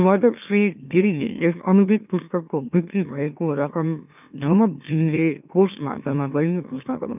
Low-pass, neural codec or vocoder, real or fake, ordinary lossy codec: 3.6 kHz; autoencoder, 44.1 kHz, a latent of 192 numbers a frame, MeloTTS; fake; none